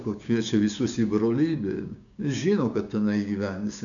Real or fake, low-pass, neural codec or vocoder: fake; 7.2 kHz; codec, 16 kHz, 6 kbps, DAC